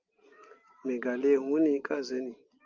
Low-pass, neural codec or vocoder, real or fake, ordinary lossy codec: 7.2 kHz; none; real; Opus, 32 kbps